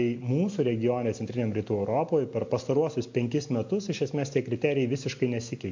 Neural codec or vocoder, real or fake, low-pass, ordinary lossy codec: none; real; 7.2 kHz; MP3, 48 kbps